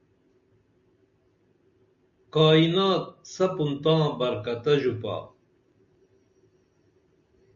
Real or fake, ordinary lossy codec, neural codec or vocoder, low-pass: real; MP3, 96 kbps; none; 7.2 kHz